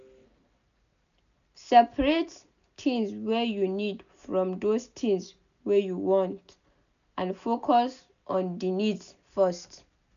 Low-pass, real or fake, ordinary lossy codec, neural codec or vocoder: 7.2 kHz; real; none; none